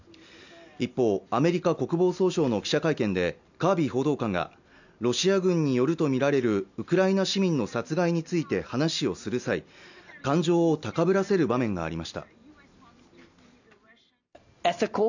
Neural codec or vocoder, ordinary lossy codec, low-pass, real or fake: none; none; 7.2 kHz; real